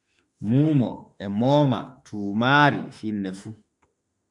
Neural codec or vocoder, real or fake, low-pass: autoencoder, 48 kHz, 32 numbers a frame, DAC-VAE, trained on Japanese speech; fake; 10.8 kHz